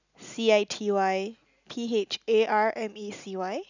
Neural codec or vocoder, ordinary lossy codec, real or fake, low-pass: none; none; real; 7.2 kHz